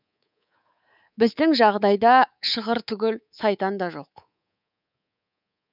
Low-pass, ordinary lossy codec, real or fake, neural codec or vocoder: 5.4 kHz; none; fake; codec, 24 kHz, 3.1 kbps, DualCodec